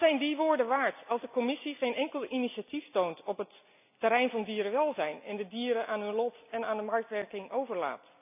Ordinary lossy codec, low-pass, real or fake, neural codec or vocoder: none; 3.6 kHz; real; none